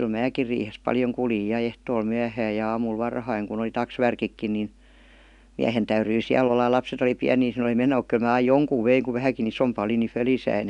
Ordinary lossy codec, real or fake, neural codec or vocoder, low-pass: none; real; none; 10.8 kHz